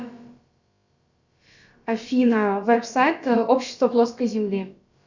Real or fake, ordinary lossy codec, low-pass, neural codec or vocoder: fake; Opus, 64 kbps; 7.2 kHz; codec, 16 kHz, about 1 kbps, DyCAST, with the encoder's durations